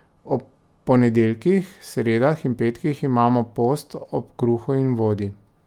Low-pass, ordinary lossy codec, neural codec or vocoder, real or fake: 19.8 kHz; Opus, 32 kbps; autoencoder, 48 kHz, 128 numbers a frame, DAC-VAE, trained on Japanese speech; fake